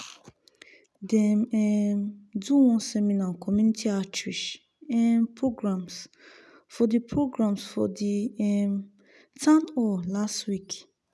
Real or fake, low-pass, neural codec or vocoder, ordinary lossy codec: real; none; none; none